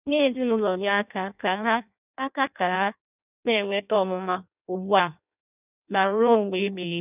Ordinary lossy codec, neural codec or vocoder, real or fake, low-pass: none; codec, 16 kHz in and 24 kHz out, 0.6 kbps, FireRedTTS-2 codec; fake; 3.6 kHz